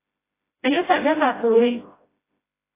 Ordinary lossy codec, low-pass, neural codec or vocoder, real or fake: AAC, 24 kbps; 3.6 kHz; codec, 16 kHz, 0.5 kbps, FreqCodec, smaller model; fake